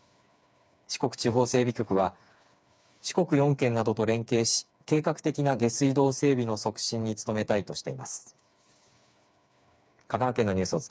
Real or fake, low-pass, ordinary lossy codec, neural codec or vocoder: fake; none; none; codec, 16 kHz, 4 kbps, FreqCodec, smaller model